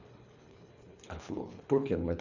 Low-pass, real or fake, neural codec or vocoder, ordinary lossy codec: 7.2 kHz; fake; codec, 24 kHz, 3 kbps, HILCodec; none